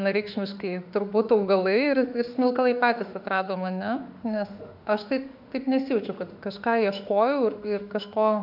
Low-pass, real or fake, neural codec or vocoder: 5.4 kHz; fake; autoencoder, 48 kHz, 32 numbers a frame, DAC-VAE, trained on Japanese speech